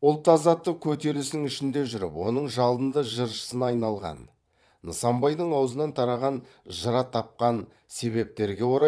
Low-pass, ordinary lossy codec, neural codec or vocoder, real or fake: none; none; vocoder, 22.05 kHz, 80 mel bands, Vocos; fake